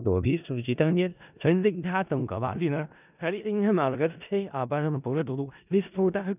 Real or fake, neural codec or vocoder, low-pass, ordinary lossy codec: fake; codec, 16 kHz in and 24 kHz out, 0.4 kbps, LongCat-Audio-Codec, four codebook decoder; 3.6 kHz; none